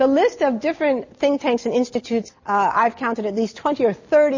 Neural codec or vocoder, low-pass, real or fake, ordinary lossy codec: none; 7.2 kHz; real; MP3, 32 kbps